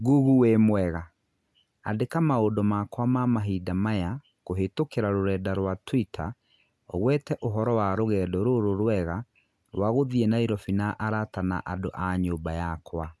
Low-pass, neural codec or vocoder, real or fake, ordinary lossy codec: none; none; real; none